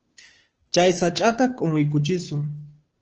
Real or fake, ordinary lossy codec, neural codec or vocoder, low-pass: fake; Opus, 16 kbps; codec, 16 kHz, 2 kbps, FunCodec, trained on Chinese and English, 25 frames a second; 7.2 kHz